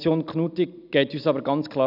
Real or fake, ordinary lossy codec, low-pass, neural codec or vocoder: real; none; 5.4 kHz; none